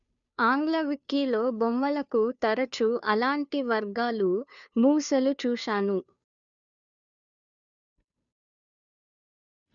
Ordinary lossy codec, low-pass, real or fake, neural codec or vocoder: none; 7.2 kHz; fake; codec, 16 kHz, 2 kbps, FunCodec, trained on Chinese and English, 25 frames a second